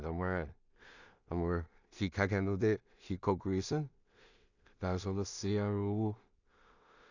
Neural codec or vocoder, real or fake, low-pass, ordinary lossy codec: codec, 16 kHz in and 24 kHz out, 0.4 kbps, LongCat-Audio-Codec, two codebook decoder; fake; 7.2 kHz; none